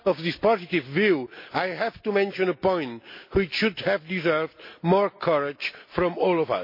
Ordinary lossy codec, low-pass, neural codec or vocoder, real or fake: MP3, 32 kbps; 5.4 kHz; none; real